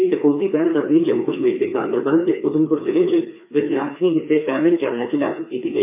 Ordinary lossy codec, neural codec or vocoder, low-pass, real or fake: MP3, 32 kbps; codec, 16 kHz, 2 kbps, FreqCodec, larger model; 3.6 kHz; fake